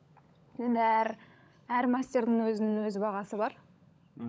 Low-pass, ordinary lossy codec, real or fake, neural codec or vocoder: none; none; fake; codec, 16 kHz, 16 kbps, FunCodec, trained on LibriTTS, 50 frames a second